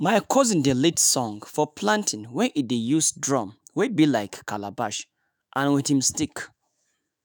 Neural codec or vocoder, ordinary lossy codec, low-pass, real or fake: autoencoder, 48 kHz, 128 numbers a frame, DAC-VAE, trained on Japanese speech; none; none; fake